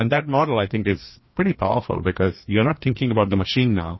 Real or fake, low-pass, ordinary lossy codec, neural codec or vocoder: fake; 7.2 kHz; MP3, 24 kbps; codec, 16 kHz, 2 kbps, FreqCodec, larger model